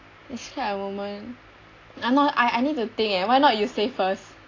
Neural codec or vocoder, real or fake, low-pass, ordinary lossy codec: none; real; 7.2 kHz; AAC, 32 kbps